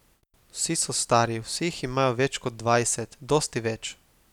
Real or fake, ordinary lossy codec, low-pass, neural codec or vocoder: real; none; 19.8 kHz; none